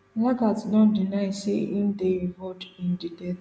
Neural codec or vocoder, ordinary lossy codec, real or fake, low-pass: none; none; real; none